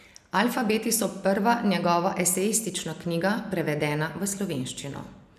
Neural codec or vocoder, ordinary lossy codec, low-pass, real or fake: none; none; 14.4 kHz; real